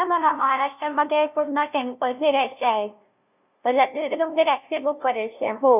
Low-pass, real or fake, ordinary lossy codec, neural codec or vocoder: 3.6 kHz; fake; none; codec, 16 kHz, 0.5 kbps, FunCodec, trained on LibriTTS, 25 frames a second